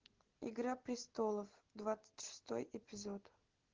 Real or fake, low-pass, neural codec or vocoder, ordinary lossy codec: real; 7.2 kHz; none; Opus, 16 kbps